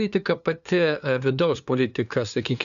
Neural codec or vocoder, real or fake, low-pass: codec, 16 kHz, 2 kbps, FunCodec, trained on LibriTTS, 25 frames a second; fake; 7.2 kHz